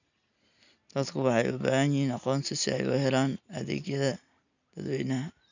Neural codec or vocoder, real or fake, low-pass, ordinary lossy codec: none; real; 7.2 kHz; none